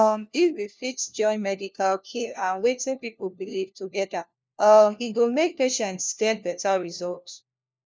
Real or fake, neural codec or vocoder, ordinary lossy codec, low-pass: fake; codec, 16 kHz, 1 kbps, FunCodec, trained on LibriTTS, 50 frames a second; none; none